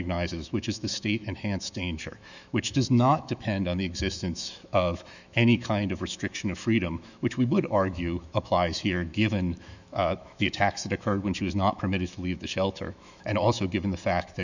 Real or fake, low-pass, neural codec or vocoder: fake; 7.2 kHz; autoencoder, 48 kHz, 128 numbers a frame, DAC-VAE, trained on Japanese speech